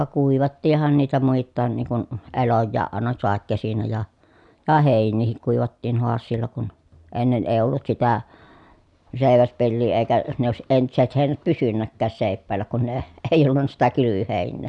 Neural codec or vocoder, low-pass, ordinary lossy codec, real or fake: none; 10.8 kHz; none; real